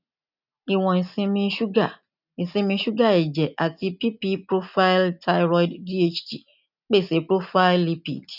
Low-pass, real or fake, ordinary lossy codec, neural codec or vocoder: 5.4 kHz; real; none; none